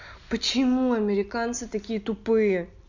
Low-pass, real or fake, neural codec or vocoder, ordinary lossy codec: 7.2 kHz; real; none; Opus, 64 kbps